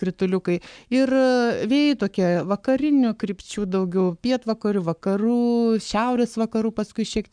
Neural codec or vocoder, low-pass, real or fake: codec, 44.1 kHz, 7.8 kbps, Pupu-Codec; 9.9 kHz; fake